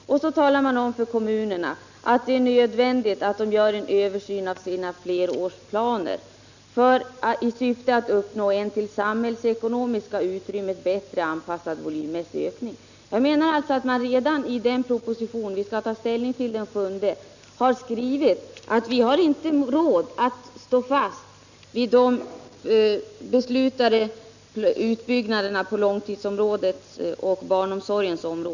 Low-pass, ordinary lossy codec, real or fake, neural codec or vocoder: 7.2 kHz; none; real; none